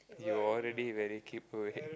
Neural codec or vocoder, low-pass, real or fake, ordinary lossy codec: none; none; real; none